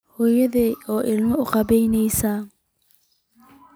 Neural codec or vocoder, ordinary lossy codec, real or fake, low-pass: none; none; real; none